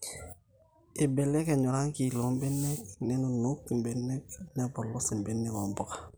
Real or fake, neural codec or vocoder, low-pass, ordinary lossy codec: real; none; none; none